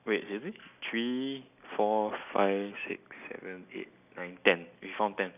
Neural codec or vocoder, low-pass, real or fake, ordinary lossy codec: none; 3.6 kHz; real; none